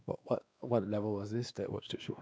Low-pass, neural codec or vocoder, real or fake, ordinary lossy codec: none; codec, 16 kHz, 2 kbps, X-Codec, WavLM features, trained on Multilingual LibriSpeech; fake; none